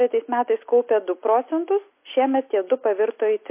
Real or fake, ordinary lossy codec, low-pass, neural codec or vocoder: real; MP3, 24 kbps; 3.6 kHz; none